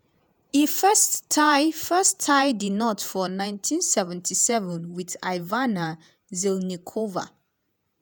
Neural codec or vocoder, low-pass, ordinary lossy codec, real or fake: vocoder, 48 kHz, 128 mel bands, Vocos; none; none; fake